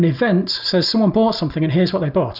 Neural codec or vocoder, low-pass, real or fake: none; 5.4 kHz; real